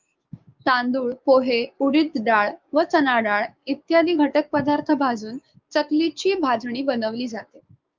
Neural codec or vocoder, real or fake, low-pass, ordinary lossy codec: codec, 16 kHz, 6 kbps, DAC; fake; 7.2 kHz; Opus, 24 kbps